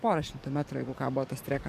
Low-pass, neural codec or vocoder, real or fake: 14.4 kHz; codec, 44.1 kHz, 7.8 kbps, DAC; fake